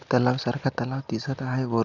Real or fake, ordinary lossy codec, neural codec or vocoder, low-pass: real; Opus, 64 kbps; none; 7.2 kHz